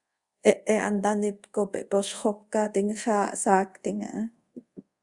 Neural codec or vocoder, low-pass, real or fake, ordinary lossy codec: codec, 24 kHz, 0.5 kbps, DualCodec; 10.8 kHz; fake; Opus, 64 kbps